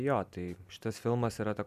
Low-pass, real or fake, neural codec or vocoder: 14.4 kHz; fake; vocoder, 44.1 kHz, 128 mel bands every 256 samples, BigVGAN v2